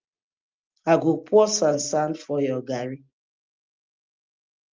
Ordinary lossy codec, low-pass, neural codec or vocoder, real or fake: Opus, 32 kbps; 7.2 kHz; none; real